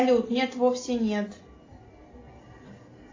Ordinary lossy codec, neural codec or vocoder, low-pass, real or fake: AAC, 32 kbps; none; 7.2 kHz; real